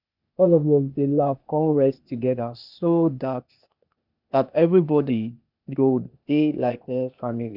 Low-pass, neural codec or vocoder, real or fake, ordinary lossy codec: 5.4 kHz; codec, 16 kHz, 0.8 kbps, ZipCodec; fake; none